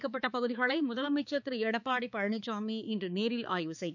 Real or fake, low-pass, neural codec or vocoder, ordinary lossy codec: fake; 7.2 kHz; codec, 16 kHz, 4 kbps, X-Codec, HuBERT features, trained on balanced general audio; none